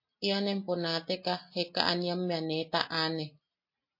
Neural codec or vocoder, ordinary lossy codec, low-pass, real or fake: none; MP3, 32 kbps; 5.4 kHz; real